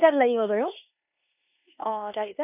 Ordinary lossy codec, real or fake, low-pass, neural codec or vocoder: none; fake; 3.6 kHz; codec, 16 kHz in and 24 kHz out, 0.9 kbps, LongCat-Audio-Codec, four codebook decoder